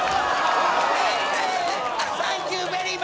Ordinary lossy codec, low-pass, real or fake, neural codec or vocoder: none; none; real; none